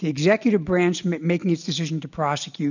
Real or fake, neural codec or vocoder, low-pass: real; none; 7.2 kHz